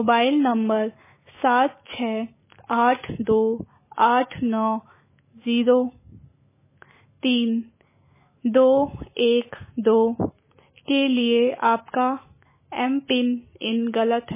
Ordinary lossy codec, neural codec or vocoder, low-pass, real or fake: MP3, 16 kbps; autoencoder, 48 kHz, 128 numbers a frame, DAC-VAE, trained on Japanese speech; 3.6 kHz; fake